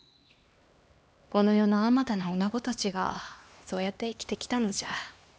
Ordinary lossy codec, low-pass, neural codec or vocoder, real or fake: none; none; codec, 16 kHz, 2 kbps, X-Codec, HuBERT features, trained on LibriSpeech; fake